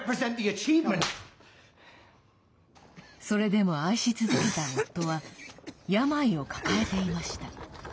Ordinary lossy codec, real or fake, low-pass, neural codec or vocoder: none; real; none; none